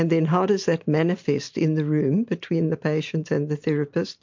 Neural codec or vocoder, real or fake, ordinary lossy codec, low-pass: none; real; MP3, 48 kbps; 7.2 kHz